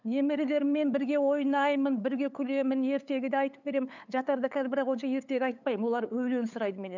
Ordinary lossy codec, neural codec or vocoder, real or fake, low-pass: none; codec, 16 kHz, 8 kbps, FunCodec, trained on LibriTTS, 25 frames a second; fake; 7.2 kHz